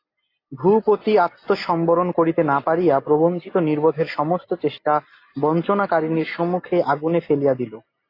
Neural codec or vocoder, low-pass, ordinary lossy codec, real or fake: none; 5.4 kHz; AAC, 32 kbps; real